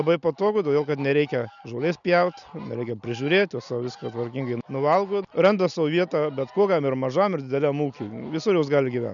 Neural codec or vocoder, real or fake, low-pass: none; real; 7.2 kHz